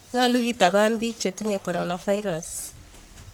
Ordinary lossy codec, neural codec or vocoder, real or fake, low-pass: none; codec, 44.1 kHz, 1.7 kbps, Pupu-Codec; fake; none